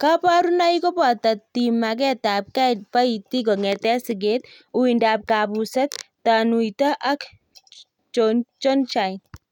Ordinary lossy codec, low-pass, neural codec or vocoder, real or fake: none; 19.8 kHz; none; real